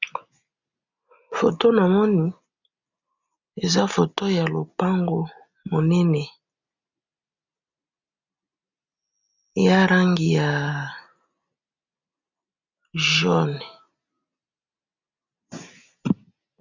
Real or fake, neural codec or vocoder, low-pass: real; none; 7.2 kHz